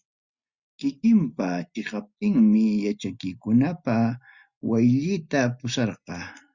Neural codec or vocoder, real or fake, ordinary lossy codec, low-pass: none; real; Opus, 64 kbps; 7.2 kHz